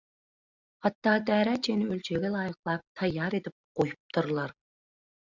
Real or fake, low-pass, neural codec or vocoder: real; 7.2 kHz; none